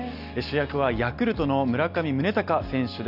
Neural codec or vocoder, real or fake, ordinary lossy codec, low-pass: none; real; none; 5.4 kHz